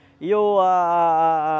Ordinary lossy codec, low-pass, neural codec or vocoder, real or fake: none; none; none; real